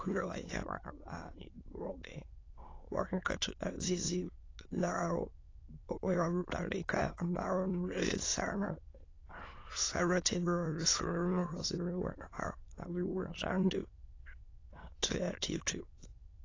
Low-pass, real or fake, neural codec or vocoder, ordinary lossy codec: 7.2 kHz; fake; autoencoder, 22.05 kHz, a latent of 192 numbers a frame, VITS, trained on many speakers; AAC, 32 kbps